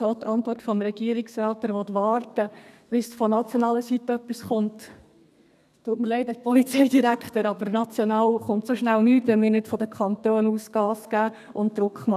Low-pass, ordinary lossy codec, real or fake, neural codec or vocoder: 14.4 kHz; none; fake; codec, 32 kHz, 1.9 kbps, SNAC